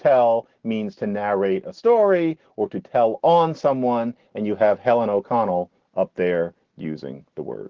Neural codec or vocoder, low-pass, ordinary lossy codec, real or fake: none; 7.2 kHz; Opus, 16 kbps; real